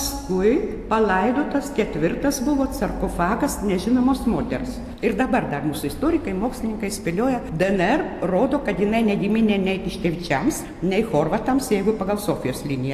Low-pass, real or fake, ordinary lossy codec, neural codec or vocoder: 14.4 kHz; real; AAC, 64 kbps; none